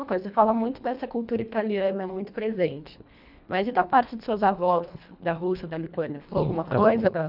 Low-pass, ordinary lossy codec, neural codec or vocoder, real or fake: 5.4 kHz; none; codec, 24 kHz, 1.5 kbps, HILCodec; fake